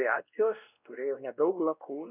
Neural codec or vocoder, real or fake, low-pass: codec, 16 kHz, 1 kbps, X-Codec, WavLM features, trained on Multilingual LibriSpeech; fake; 3.6 kHz